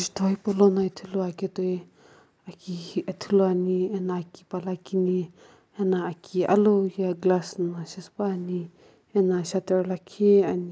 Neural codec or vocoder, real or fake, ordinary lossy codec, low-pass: none; real; none; none